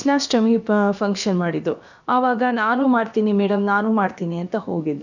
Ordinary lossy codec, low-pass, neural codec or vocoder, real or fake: none; 7.2 kHz; codec, 16 kHz, about 1 kbps, DyCAST, with the encoder's durations; fake